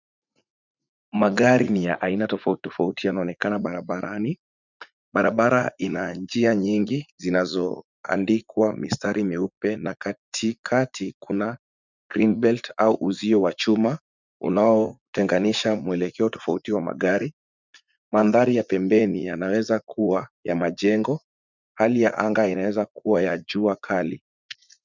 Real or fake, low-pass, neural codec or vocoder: fake; 7.2 kHz; vocoder, 44.1 kHz, 80 mel bands, Vocos